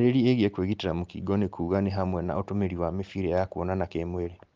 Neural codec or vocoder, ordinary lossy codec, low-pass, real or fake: none; Opus, 32 kbps; 7.2 kHz; real